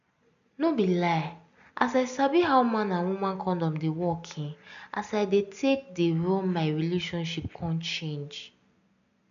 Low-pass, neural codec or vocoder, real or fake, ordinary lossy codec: 7.2 kHz; none; real; none